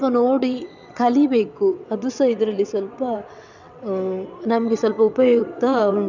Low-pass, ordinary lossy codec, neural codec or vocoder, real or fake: 7.2 kHz; none; vocoder, 22.05 kHz, 80 mel bands, WaveNeXt; fake